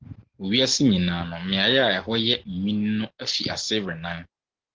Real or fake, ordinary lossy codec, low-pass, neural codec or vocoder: real; Opus, 16 kbps; 7.2 kHz; none